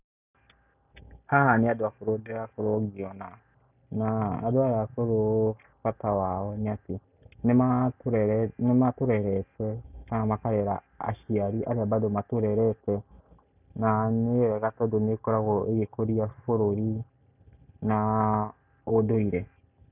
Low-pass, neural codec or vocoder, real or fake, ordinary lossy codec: 3.6 kHz; none; real; none